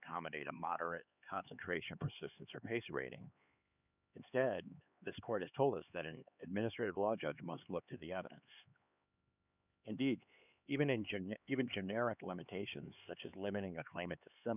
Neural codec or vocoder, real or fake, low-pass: codec, 16 kHz, 4 kbps, X-Codec, HuBERT features, trained on LibriSpeech; fake; 3.6 kHz